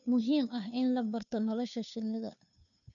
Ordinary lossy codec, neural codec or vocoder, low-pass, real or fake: none; codec, 16 kHz, 2 kbps, FunCodec, trained on Chinese and English, 25 frames a second; 7.2 kHz; fake